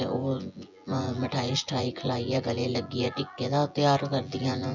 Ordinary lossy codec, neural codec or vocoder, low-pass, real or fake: none; vocoder, 24 kHz, 100 mel bands, Vocos; 7.2 kHz; fake